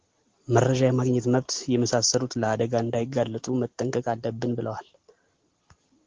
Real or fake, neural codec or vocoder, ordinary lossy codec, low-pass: real; none; Opus, 16 kbps; 7.2 kHz